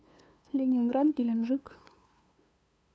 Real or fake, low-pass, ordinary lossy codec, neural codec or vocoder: fake; none; none; codec, 16 kHz, 2 kbps, FunCodec, trained on LibriTTS, 25 frames a second